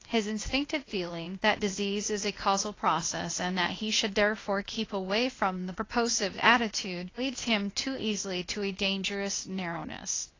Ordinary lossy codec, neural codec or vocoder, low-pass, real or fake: AAC, 32 kbps; codec, 16 kHz, 0.8 kbps, ZipCodec; 7.2 kHz; fake